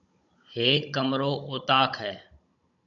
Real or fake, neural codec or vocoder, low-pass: fake; codec, 16 kHz, 16 kbps, FunCodec, trained on Chinese and English, 50 frames a second; 7.2 kHz